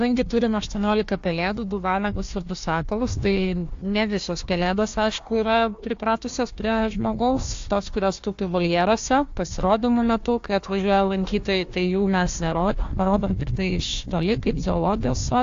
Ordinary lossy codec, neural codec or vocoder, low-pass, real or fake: AAC, 48 kbps; codec, 16 kHz, 1 kbps, FunCodec, trained on Chinese and English, 50 frames a second; 7.2 kHz; fake